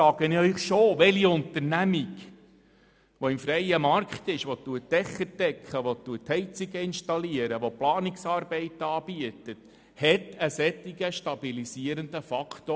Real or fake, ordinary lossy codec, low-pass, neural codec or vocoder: real; none; none; none